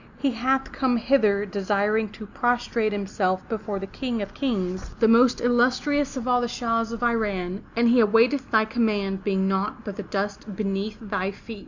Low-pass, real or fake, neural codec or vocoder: 7.2 kHz; real; none